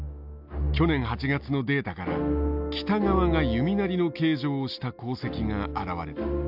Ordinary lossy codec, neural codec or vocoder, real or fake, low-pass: AAC, 48 kbps; none; real; 5.4 kHz